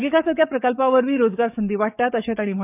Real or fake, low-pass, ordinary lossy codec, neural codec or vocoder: fake; 3.6 kHz; none; codec, 44.1 kHz, 7.8 kbps, DAC